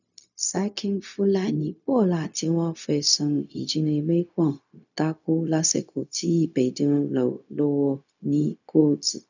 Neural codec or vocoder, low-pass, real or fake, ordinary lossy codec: codec, 16 kHz, 0.4 kbps, LongCat-Audio-Codec; 7.2 kHz; fake; none